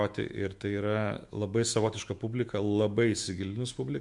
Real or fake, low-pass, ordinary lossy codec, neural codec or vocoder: fake; 10.8 kHz; MP3, 64 kbps; autoencoder, 48 kHz, 128 numbers a frame, DAC-VAE, trained on Japanese speech